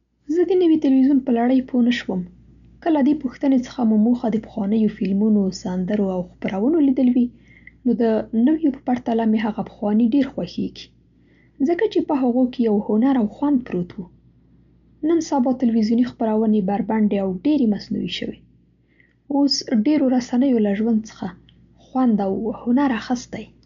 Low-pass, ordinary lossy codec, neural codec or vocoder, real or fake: 7.2 kHz; none; none; real